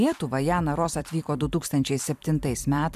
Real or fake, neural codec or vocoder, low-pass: fake; vocoder, 44.1 kHz, 128 mel bands every 256 samples, BigVGAN v2; 14.4 kHz